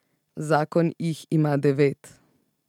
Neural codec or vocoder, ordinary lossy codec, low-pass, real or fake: none; none; 19.8 kHz; real